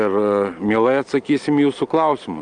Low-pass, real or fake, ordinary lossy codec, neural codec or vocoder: 9.9 kHz; real; Opus, 32 kbps; none